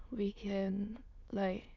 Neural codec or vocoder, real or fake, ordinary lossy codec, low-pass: autoencoder, 22.05 kHz, a latent of 192 numbers a frame, VITS, trained on many speakers; fake; none; 7.2 kHz